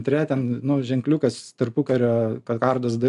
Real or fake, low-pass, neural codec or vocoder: real; 10.8 kHz; none